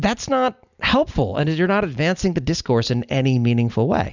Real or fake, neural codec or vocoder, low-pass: real; none; 7.2 kHz